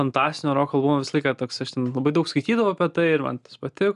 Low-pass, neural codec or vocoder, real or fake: 10.8 kHz; none; real